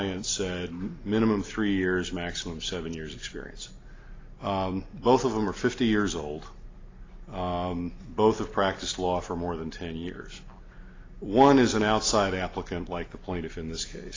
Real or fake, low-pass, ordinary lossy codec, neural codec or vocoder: real; 7.2 kHz; AAC, 32 kbps; none